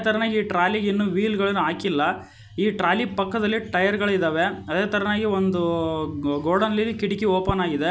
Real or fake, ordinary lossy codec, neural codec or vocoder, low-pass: real; none; none; none